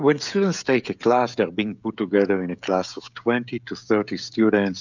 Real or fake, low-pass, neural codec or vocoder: fake; 7.2 kHz; codec, 16 kHz, 16 kbps, FreqCodec, smaller model